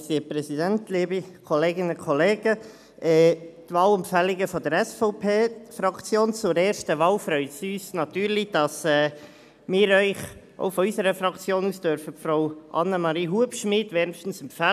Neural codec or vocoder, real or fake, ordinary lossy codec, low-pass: none; real; none; 14.4 kHz